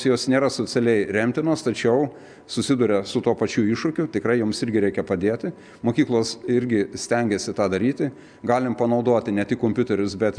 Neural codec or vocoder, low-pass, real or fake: none; 9.9 kHz; real